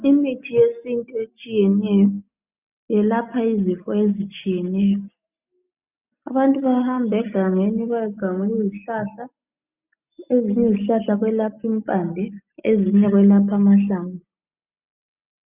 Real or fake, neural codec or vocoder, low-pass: real; none; 3.6 kHz